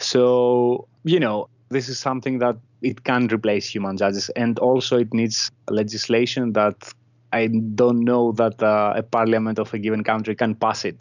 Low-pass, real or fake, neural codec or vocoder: 7.2 kHz; real; none